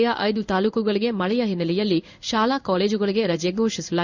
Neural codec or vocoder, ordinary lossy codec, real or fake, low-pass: codec, 16 kHz in and 24 kHz out, 1 kbps, XY-Tokenizer; none; fake; 7.2 kHz